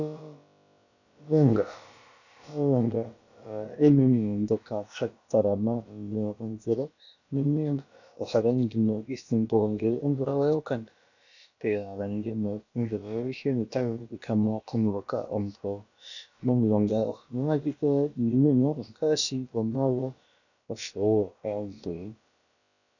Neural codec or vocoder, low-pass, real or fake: codec, 16 kHz, about 1 kbps, DyCAST, with the encoder's durations; 7.2 kHz; fake